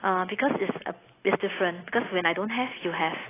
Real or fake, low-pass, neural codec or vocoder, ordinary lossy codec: real; 3.6 kHz; none; AAC, 16 kbps